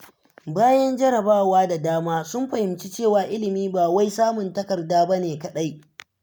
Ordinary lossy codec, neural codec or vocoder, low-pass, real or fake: none; none; none; real